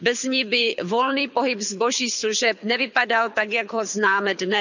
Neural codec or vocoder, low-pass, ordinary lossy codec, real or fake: codec, 24 kHz, 6 kbps, HILCodec; 7.2 kHz; none; fake